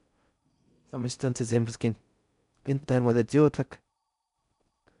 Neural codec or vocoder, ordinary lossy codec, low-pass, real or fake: codec, 16 kHz in and 24 kHz out, 0.6 kbps, FocalCodec, streaming, 4096 codes; none; 10.8 kHz; fake